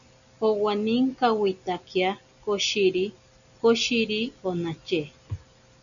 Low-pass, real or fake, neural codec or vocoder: 7.2 kHz; real; none